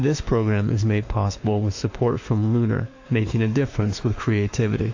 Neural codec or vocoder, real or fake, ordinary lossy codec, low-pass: autoencoder, 48 kHz, 32 numbers a frame, DAC-VAE, trained on Japanese speech; fake; AAC, 48 kbps; 7.2 kHz